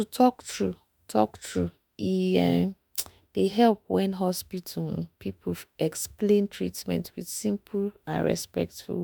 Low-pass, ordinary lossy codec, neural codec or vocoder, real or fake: none; none; autoencoder, 48 kHz, 32 numbers a frame, DAC-VAE, trained on Japanese speech; fake